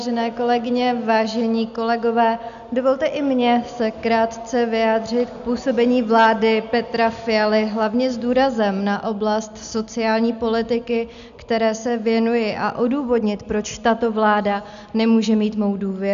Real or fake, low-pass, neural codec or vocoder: real; 7.2 kHz; none